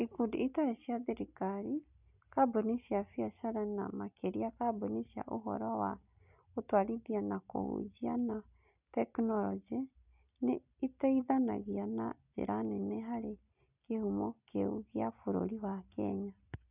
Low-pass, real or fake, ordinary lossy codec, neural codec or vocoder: 3.6 kHz; real; none; none